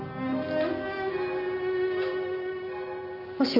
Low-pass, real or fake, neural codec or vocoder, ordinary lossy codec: 5.4 kHz; real; none; none